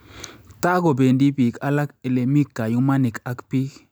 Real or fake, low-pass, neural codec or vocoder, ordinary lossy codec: real; none; none; none